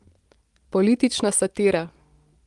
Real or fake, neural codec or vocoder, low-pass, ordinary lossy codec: real; none; 10.8 kHz; Opus, 24 kbps